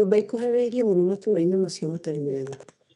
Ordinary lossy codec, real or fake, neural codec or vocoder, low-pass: none; fake; codec, 24 kHz, 0.9 kbps, WavTokenizer, medium music audio release; 10.8 kHz